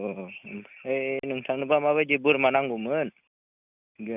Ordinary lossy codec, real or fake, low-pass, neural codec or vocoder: none; real; 3.6 kHz; none